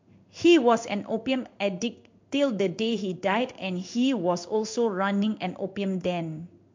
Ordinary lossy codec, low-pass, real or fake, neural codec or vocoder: MP3, 48 kbps; 7.2 kHz; fake; codec, 16 kHz in and 24 kHz out, 1 kbps, XY-Tokenizer